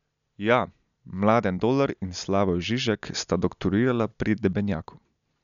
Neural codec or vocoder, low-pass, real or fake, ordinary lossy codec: none; 7.2 kHz; real; none